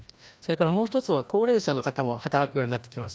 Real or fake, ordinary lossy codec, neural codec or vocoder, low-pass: fake; none; codec, 16 kHz, 1 kbps, FreqCodec, larger model; none